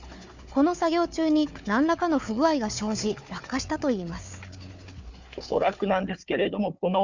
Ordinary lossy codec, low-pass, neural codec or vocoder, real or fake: Opus, 64 kbps; 7.2 kHz; codec, 16 kHz, 4 kbps, FunCodec, trained on Chinese and English, 50 frames a second; fake